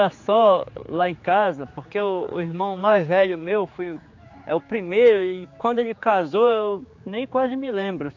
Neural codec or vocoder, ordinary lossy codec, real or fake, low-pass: codec, 16 kHz, 4 kbps, X-Codec, HuBERT features, trained on general audio; AAC, 48 kbps; fake; 7.2 kHz